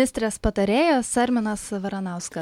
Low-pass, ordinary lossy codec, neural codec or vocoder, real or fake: 19.8 kHz; MP3, 96 kbps; none; real